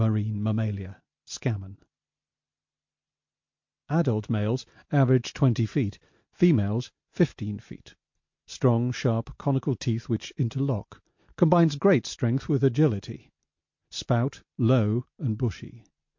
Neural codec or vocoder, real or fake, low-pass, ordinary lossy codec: none; real; 7.2 kHz; MP3, 48 kbps